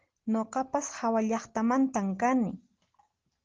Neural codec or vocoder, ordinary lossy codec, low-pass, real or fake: none; Opus, 16 kbps; 7.2 kHz; real